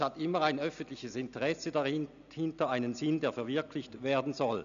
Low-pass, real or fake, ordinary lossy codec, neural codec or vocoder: 7.2 kHz; real; none; none